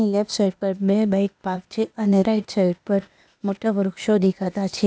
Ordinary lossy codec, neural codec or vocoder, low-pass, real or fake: none; codec, 16 kHz, 0.8 kbps, ZipCodec; none; fake